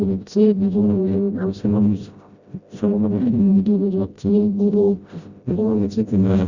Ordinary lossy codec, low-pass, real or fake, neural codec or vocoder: Opus, 64 kbps; 7.2 kHz; fake; codec, 16 kHz, 0.5 kbps, FreqCodec, smaller model